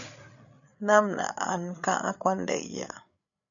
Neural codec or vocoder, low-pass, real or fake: codec, 16 kHz, 8 kbps, FreqCodec, larger model; 7.2 kHz; fake